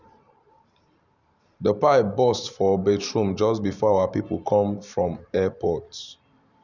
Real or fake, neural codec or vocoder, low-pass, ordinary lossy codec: fake; vocoder, 44.1 kHz, 128 mel bands every 512 samples, BigVGAN v2; 7.2 kHz; none